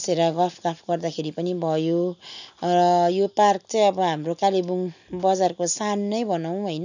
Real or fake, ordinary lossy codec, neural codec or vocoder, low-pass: real; none; none; 7.2 kHz